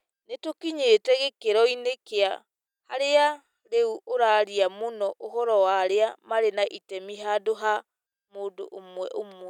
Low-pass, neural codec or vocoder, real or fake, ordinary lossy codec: 19.8 kHz; none; real; none